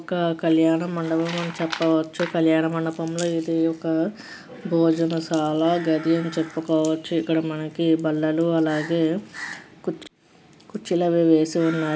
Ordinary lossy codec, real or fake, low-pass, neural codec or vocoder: none; real; none; none